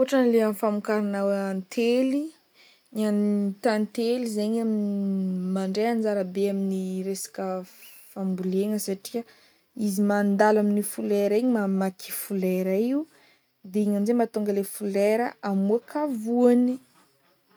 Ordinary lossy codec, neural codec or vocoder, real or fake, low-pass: none; none; real; none